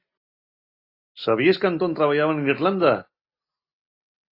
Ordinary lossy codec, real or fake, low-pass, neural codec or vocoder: AAC, 32 kbps; real; 5.4 kHz; none